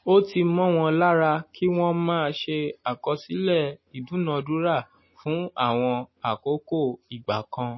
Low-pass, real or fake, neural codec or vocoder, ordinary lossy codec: 7.2 kHz; real; none; MP3, 24 kbps